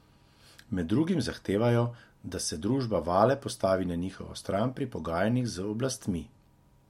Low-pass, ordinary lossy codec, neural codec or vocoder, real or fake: 19.8 kHz; MP3, 64 kbps; none; real